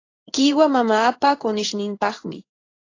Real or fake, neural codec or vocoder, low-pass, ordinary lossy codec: fake; codec, 16 kHz in and 24 kHz out, 1 kbps, XY-Tokenizer; 7.2 kHz; AAC, 32 kbps